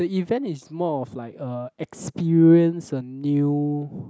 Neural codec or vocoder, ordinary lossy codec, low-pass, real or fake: none; none; none; real